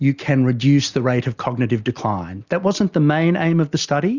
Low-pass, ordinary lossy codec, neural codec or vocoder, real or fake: 7.2 kHz; Opus, 64 kbps; none; real